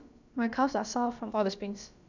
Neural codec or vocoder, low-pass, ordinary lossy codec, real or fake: codec, 16 kHz, about 1 kbps, DyCAST, with the encoder's durations; 7.2 kHz; none; fake